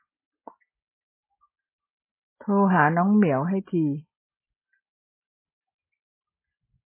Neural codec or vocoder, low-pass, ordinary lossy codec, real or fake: none; 3.6 kHz; MP3, 16 kbps; real